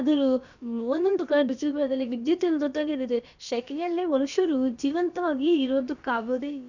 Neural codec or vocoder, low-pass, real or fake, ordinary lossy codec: codec, 16 kHz, about 1 kbps, DyCAST, with the encoder's durations; 7.2 kHz; fake; none